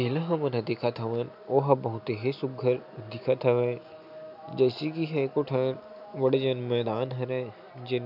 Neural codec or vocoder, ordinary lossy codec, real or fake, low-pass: none; none; real; 5.4 kHz